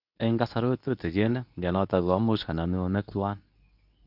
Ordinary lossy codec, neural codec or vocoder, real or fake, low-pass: none; codec, 24 kHz, 0.9 kbps, WavTokenizer, medium speech release version 2; fake; 5.4 kHz